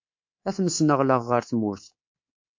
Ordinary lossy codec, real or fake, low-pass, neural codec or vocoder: MP3, 48 kbps; fake; 7.2 kHz; codec, 24 kHz, 3.1 kbps, DualCodec